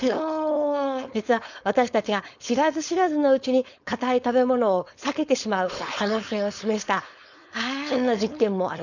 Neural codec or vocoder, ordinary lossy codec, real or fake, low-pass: codec, 16 kHz, 4.8 kbps, FACodec; none; fake; 7.2 kHz